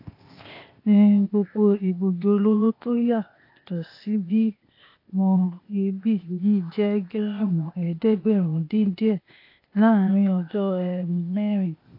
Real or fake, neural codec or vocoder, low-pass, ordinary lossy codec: fake; codec, 16 kHz, 0.8 kbps, ZipCodec; 5.4 kHz; AAC, 32 kbps